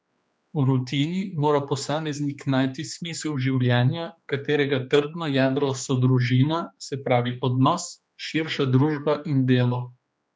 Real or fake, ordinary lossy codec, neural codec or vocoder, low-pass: fake; none; codec, 16 kHz, 2 kbps, X-Codec, HuBERT features, trained on general audio; none